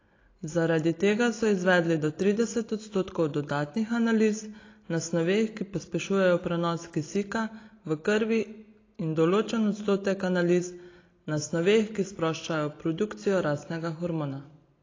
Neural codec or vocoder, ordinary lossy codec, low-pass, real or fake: none; AAC, 32 kbps; 7.2 kHz; real